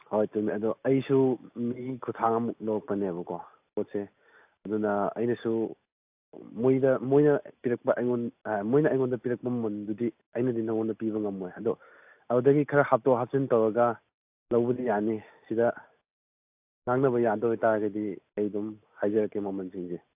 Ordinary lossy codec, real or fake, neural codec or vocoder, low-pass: AAC, 32 kbps; real; none; 3.6 kHz